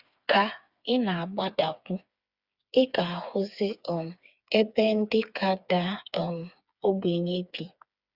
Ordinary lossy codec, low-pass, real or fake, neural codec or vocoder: Opus, 64 kbps; 5.4 kHz; fake; codec, 16 kHz, 4 kbps, FreqCodec, smaller model